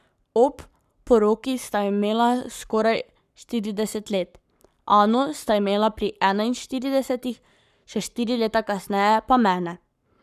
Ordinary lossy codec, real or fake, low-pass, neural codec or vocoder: none; fake; 14.4 kHz; codec, 44.1 kHz, 7.8 kbps, Pupu-Codec